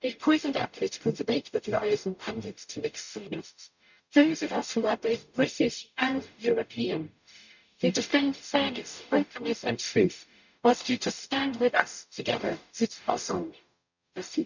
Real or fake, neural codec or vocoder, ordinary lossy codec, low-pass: fake; codec, 44.1 kHz, 0.9 kbps, DAC; none; 7.2 kHz